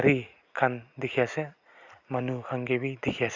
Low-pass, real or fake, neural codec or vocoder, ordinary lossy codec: 7.2 kHz; real; none; Opus, 64 kbps